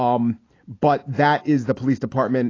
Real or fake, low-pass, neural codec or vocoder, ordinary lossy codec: real; 7.2 kHz; none; AAC, 32 kbps